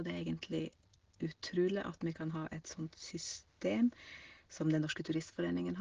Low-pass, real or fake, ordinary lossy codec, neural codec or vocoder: 7.2 kHz; real; Opus, 16 kbps; none